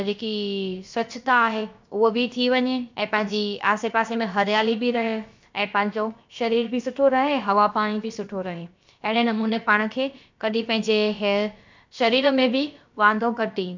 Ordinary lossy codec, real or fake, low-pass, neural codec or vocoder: MP3, 64 kbps; fake; 7.2 kHz; codec, 16 kHz, about 1 kbps, DyCAST, with the encoder's durations